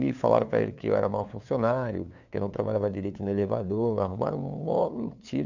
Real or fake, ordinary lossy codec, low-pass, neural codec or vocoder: fake; none; 7.2 kHz; codec, 16 kHz, 2 kbps, FunCodec, trained on LibriTTS, 25 frames a second